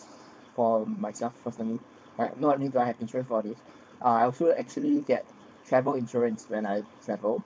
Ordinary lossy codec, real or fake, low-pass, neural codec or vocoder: none; fake; none; codec, 16 kHz, 4.8 kbps, FACodec